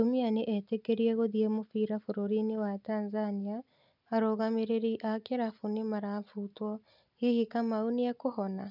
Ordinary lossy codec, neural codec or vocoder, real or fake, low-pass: none; none; real; 5.4 kHz